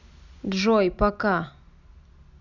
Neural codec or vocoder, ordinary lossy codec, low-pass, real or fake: none; none; 7.2 kHz; real